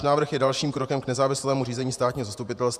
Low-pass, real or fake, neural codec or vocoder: 14.4 kHz; fake; vocoder, 48 kHz, 128 mel bands, Vocos